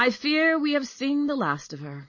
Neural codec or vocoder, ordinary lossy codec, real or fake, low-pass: none; MP3, 32 kbps; real; 7.2 kHz